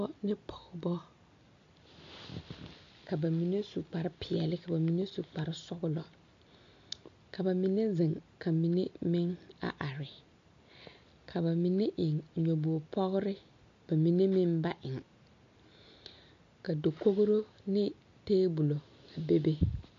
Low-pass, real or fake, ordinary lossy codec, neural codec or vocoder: 7.2 kHz; real; MP3, 48 kbps; none